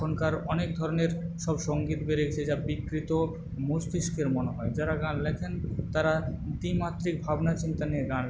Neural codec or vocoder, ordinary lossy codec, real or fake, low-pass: none; none; real; none